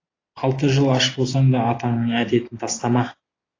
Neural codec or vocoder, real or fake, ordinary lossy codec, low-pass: none; real; AAC, 32 kbps; 7.2 kHz